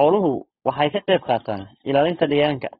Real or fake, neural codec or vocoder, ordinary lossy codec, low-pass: fake; codec, 16 kHz, 4.8 kbps, FACodec; AAC, 16 kbps; 7.2 kHz